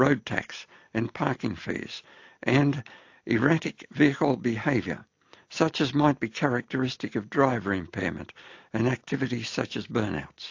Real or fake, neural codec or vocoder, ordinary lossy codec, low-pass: real; none; AAC, 48 kbps; 7.2 kHz